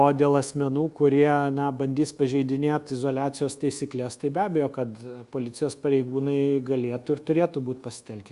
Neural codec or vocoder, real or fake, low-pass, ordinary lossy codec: codec, 24 kHz, 1.2 kbps, DualCodec; fake; 10.8 kHz; Opus, 64 kbps